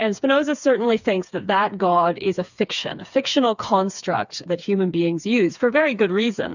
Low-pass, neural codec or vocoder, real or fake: 7.2 kHz; codec, 16 kHz, 4 kbps, FreqCodec, smaller model; fake